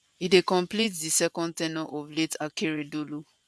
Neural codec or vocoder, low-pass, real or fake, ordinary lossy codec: vocoder, 24 kHz, 100 mel bands, Vocos; none; fake; none